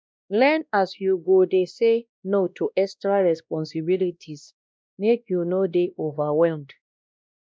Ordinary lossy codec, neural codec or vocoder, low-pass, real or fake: none; codec, 16 kHz, 1 kbps, X-Codec, WavLM features, trained on Multilingual LibriSpeech; none; fake